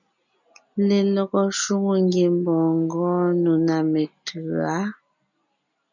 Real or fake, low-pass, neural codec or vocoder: real; 7.2 kHz; none